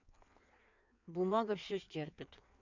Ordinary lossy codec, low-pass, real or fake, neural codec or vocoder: none; 7.2 kHz; fake; codec, 16 kHz in and 24 kHz out, 1.1 kbps, FireRedTTS-2 codec